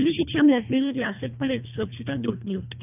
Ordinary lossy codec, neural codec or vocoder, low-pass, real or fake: none; codec, 24 kHz, 1.5 kbps, HILCodec; 3.6 kHz; fake